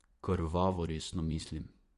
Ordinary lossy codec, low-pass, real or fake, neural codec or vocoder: Opus, 64 kbps; 10.8 kHz; fake; vocoder, 24 kHz, 100 mel bands, Vocos